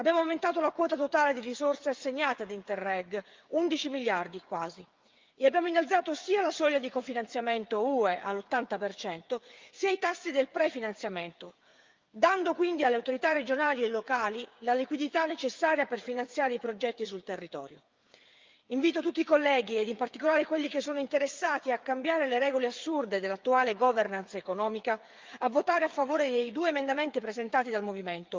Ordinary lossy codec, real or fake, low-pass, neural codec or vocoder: Opus, 24 kbps; fake; 7.2 kHz; codec, 16 kHz, 8 kbps, FreqCodec, smaller model